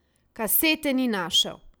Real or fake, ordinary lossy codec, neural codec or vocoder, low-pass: real; none; none; none